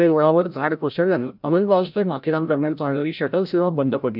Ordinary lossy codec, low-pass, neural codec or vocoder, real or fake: none; 5.4 kHz; codec, 16 kHz, 0.5 kbps, FreqCodec, larger model; fake